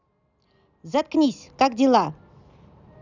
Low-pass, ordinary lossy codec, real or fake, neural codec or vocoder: 7.2 kHz; none; real; none